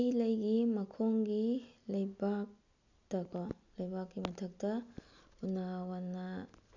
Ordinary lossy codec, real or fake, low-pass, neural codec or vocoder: none; real; 7.2 kHz; none